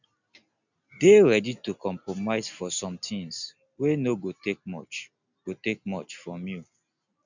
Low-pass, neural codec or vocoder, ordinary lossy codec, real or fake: 7.2 kHz; none; none; real